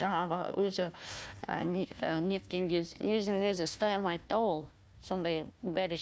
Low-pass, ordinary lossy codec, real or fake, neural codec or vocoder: none; none; fake; codec, 16 kHz, 1 kbps, FunCodec, trained on Chinese and English, 50 frames a second